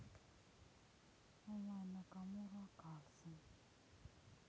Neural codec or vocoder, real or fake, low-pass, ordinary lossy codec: none; real; none; none